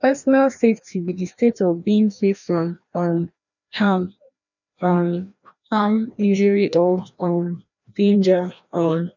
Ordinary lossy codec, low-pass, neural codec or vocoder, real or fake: none; 7.2 kHz; codec, 16 kHz, 1 kbps, FreqCodec, larger model; fake